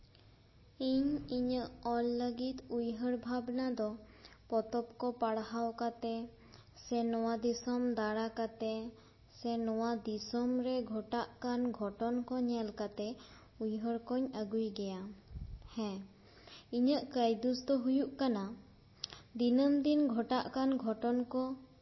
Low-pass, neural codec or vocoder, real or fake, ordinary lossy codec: 7.2 kHz; none; real; MP3, 24 kbps